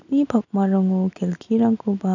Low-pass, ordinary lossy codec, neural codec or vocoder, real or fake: 7.2 kHz; none; none; real